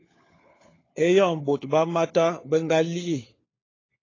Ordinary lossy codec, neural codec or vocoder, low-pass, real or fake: AAC, 32 kbps; codec, 16 kHz, 4 kbps, FunCodec, trained on LibriTTS, 50 frames a second; 7.2 kHz; fake